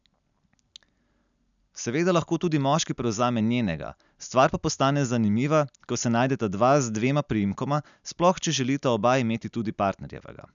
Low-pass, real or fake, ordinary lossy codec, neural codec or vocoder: 7.2 kHz; real; none; none